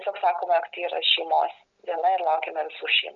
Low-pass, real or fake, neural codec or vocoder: 7.2 kHz; real; none